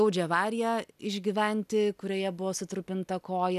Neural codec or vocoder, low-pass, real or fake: none; 14.4 kHz; real